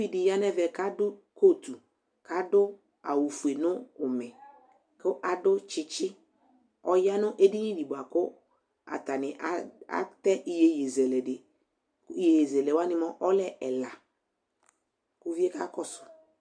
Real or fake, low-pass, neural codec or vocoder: real; 9.9 kHz; none